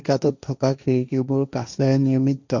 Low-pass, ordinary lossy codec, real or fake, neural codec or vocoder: 7.2 kHz; none; fake; codec, 16 kHz, 1.1 kbps, Voila-Tokenizer